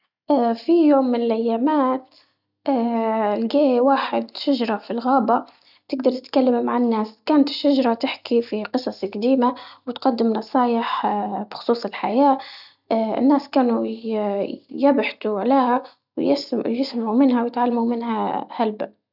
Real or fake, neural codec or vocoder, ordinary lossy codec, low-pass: real; none; none; 5.4 kHz